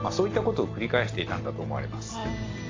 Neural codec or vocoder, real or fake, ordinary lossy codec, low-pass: none; real; AAC, 32 kbps; 7.2 kHz